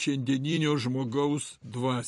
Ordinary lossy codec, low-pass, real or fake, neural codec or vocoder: MP3, 48 kbps; 14.4 kHz; fake; vocoder, 44.1 kHz, 128 mel bands every 256 samples, BigVGAN v2